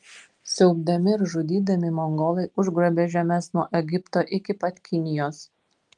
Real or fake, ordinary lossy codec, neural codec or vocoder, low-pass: real; Opus, 32 kbps; none; 10.8 kHz